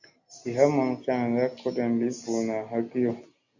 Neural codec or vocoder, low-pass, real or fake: none; 7.2 kHz; real